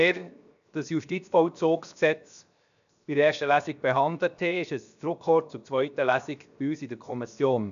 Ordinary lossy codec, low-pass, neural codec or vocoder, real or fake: none; 7.2 kHz; codec, 16 kHz, 0.7 kbps, FocalCodec; fake